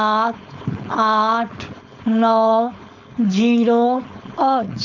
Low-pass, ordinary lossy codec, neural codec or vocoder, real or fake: 7.2 kHz; none; codec, 16 kHz, 4.8 kbps, FACodec; fake